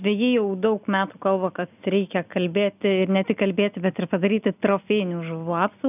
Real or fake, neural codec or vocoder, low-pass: real; none; 3.6 kHz